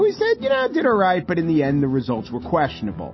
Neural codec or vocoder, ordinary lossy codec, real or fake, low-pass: none; MP3, 24 kbps; real; 7.2 kHz